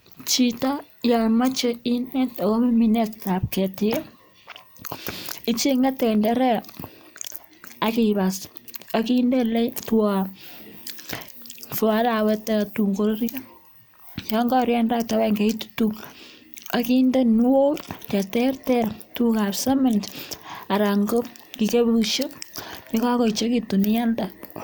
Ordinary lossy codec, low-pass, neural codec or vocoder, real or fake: none; none; none; real